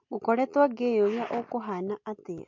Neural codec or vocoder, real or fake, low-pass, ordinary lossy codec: none; real; 7.2 kHz; MP3, 48 kbps